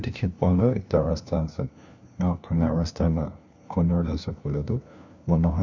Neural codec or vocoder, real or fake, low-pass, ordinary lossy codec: codec, 16 kHz, 1 kbps, FunCodec, trained on LibriTTS, 50 frames a second; fake; 7.2 kHz; none